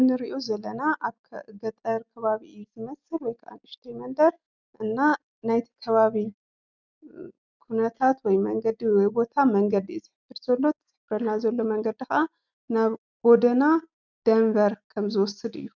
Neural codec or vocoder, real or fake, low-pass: none; real; 7.2 kHz